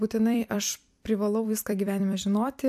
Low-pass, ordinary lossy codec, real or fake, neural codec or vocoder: 14.4 kHz; AAC, 96 kbps; fake; vocoder, 44.1 kHz, 128 mel bands every 256 samples, BigVGAN v2